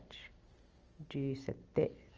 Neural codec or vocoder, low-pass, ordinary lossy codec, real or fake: none; 7.2 kHz; Opus, 24 kbps; real